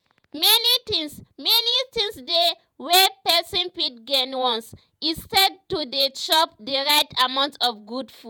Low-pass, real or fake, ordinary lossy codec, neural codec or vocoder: none; fake; none; vocoder, 48 kHz, 128 mel bands, Vocos